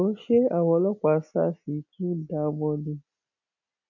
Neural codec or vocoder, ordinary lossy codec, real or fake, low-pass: none; none; real; 7.2 kHz